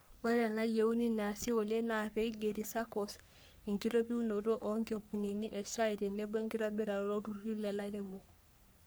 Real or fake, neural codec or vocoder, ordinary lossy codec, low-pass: fake; codec, 44.1 kHz, 3.4 kbps, Pupu-Codec; none; none